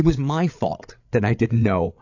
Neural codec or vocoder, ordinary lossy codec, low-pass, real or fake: codec, 16 kHz, 8 kbps, FreqCodec, larger model; AAC, 48 kbps; 7.2 kHz; fake